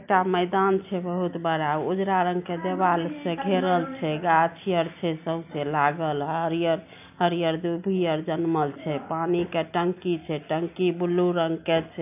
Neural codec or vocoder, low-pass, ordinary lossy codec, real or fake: none; 3.6 kHz; AAC, 32 kbps; real